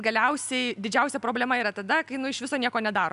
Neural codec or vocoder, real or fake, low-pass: none; real; 10.8 kHz